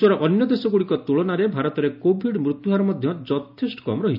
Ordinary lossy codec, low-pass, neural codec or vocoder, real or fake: none; 5.4 kHz; none; real